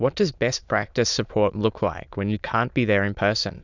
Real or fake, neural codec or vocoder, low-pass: fake; autoencoder, 22.05 kHz, a latent of 192 numbers a frame, VITS, trained on many speakers; 7.2 kHz